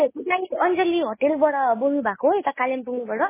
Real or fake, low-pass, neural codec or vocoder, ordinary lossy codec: real; 3.6 kHz; none; MP3, 16 kbps